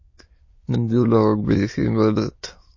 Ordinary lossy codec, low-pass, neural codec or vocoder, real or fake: MP3, 32 kbps; 7.2 kHz; autoencoder, 22.05 kHz, a latent of 192 numbers a frame, VITS, trained on many speakers; fake